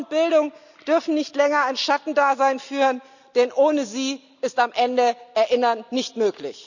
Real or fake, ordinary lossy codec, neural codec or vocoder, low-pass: real; none; none; 7.2 kHz